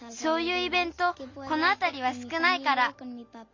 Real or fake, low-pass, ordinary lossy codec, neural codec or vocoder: real; 7.2 kHz; MP3, 48 kbps; none